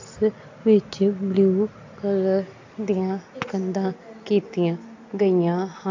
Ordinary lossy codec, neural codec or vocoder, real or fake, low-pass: AAC, 48 kbps; none; real; 7.2 kHz